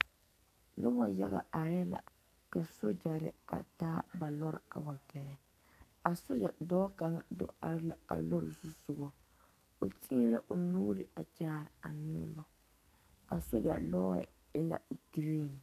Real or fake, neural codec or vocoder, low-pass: fake; codec, 44.1 kHz, 2.6 kbps, SNAC; 14.4 kHz